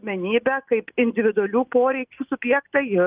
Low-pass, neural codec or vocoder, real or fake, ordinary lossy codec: 3.6 kHz; none; real; Opus, 24 kbps